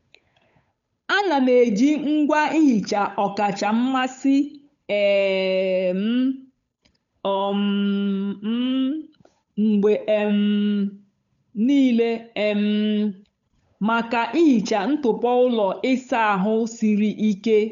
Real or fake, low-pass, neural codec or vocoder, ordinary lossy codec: fake; 7.2 kHz; codec, 16 kHz, 8 kbps, FunCodec, trained on Chinese and English, 25 frames a second; none